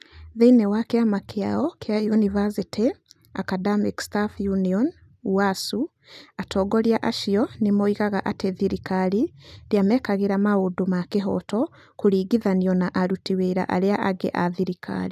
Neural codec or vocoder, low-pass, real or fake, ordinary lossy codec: none; 14.4 kHz; real; none